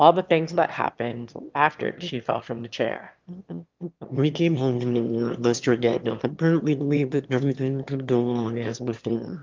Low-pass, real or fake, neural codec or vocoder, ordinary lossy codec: 7.2 kHz; fake; autoencoder, 22.05 kHz, a latent of 192 numbers a frame, VITS, trained on one speaker; Opus, 32 kbps